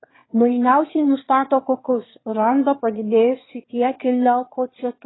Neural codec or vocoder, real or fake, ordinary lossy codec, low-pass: autoencoder, 22.05 kHz, a latent of 192 numbers a frame, VITS, trained on one speaker; fake; AAC, 16 kbps; 7.2 kHz